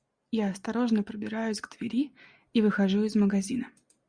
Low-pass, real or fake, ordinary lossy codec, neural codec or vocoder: 9.9 kHz; real; Opus, 64 kbps; none